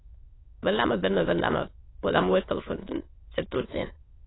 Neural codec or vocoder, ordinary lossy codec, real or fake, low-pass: autoencoder, 22.05 kHz, a latent of 192 numbers a frame, VITS, trained on many speakers; AAC, 16 kbps; fake; 7.2 kHz